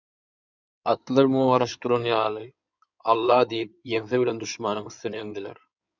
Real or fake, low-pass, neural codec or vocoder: fake; 7.2 kHz; codec, 16 kHz in and 24 kHz out, 2.2 kbps, FireRedTTS-2 codec